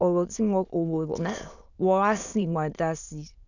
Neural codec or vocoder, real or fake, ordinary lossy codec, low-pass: autoencoder, 22.05 kHz, a latent of 192 numbers a frame, VITS, trained on many speakers; fake; none; 7.2 kHz